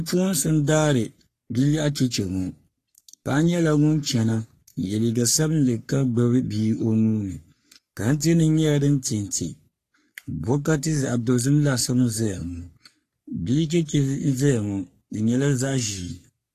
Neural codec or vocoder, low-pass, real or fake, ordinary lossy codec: codec, 44.1 kHz, 3.4 kbps, Pupu-Codec; 14.4 kHz; fake; AAC, 48 kbps